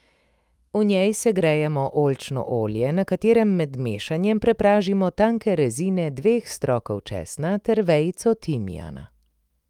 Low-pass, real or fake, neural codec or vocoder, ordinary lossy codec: 19.8 kHz; fake; autoencoder, 48 kHz, 128 numbers a frame, DAC-VAE, trained on Japanese speech; Opus, 32 kbps